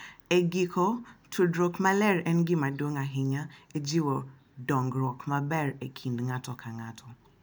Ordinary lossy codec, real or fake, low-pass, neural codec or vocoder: none; real; none; none